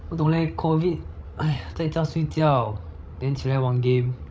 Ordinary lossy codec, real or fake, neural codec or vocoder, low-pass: none; fake; codec, 16 kHz, 16 kbps, FreqCodec, larger model; none